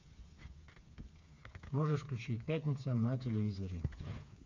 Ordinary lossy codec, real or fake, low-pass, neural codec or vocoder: MP3, 48 kbps; fake; 7.2 kHz; codec, 16 kHz, 4 kbps, FreqCodec, smaller model